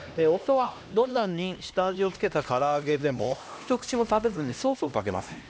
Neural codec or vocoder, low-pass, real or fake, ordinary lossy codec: codec, 16 kHz, 1 kbps, X-Codec, HuBERT features, trained on LibriSpeech; none; fake; none